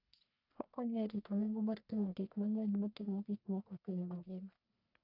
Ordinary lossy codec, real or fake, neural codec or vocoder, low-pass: none; fake; codec, 44.1 kHz, 1.7 kbps, Pupu-Codec; 5.4 kHz